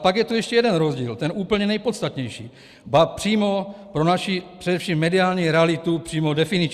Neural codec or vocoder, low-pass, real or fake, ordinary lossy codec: none; 14.4 kHz; real; Opus, 64 kbps